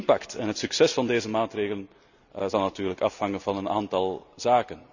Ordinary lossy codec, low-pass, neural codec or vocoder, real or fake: none; 7.2 kHz; none; real